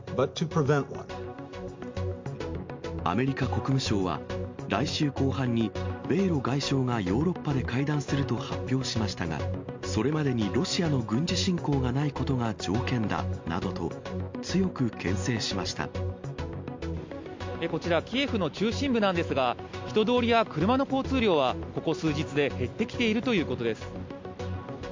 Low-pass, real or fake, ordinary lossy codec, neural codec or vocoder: 7.2 kHz; real; MP3, 48 kbps; none